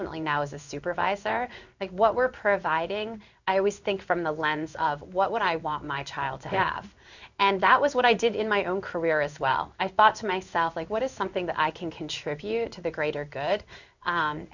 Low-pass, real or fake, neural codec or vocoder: 7.2 kHz; fake; codec, 16 kHz in and 24 kHz out, 1 kbps, XY-Tokenizer